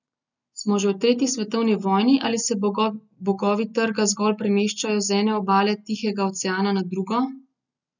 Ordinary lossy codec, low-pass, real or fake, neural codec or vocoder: none; 7.2 kHz; real; none